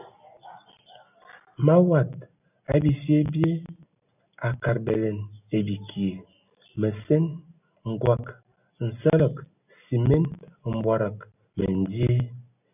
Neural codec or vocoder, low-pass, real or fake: none; 3.6 kHz; real